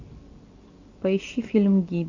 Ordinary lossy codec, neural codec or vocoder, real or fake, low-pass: MP3, 48 kbps; none; real; 7.2 kHz